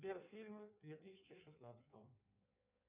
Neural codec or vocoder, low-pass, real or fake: codec, 16 kHz in and 24 kHz out, 1.1 kbps, FireRedTTS-2 codec; 3.6 kHz; fake